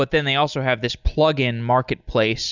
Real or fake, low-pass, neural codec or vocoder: real; 7.2 kHz; none